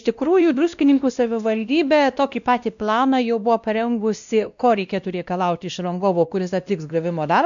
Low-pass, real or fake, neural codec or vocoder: 7.2 kHz; fake; codec, 16 kHz, 1 kbps, X-Codec, WavLM features, trained on Multilingual LibriSpeech